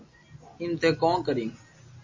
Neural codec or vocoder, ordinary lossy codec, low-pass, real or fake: none; MP3, 32 kbps; 7.2 kHz; real